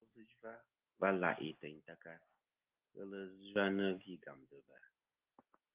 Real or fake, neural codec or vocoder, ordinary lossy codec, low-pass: real; none; Opus, 24 kbps; 3.6 kHz